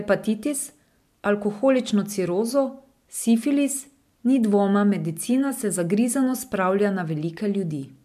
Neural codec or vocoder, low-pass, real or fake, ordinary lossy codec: none; 14.4 kHz; real; MP3, 96 kbps